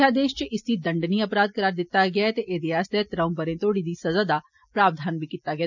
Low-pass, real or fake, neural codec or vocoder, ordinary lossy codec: 7.2 kHz; real; none; none